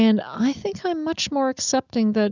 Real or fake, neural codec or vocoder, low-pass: real; none; 7.2 kHz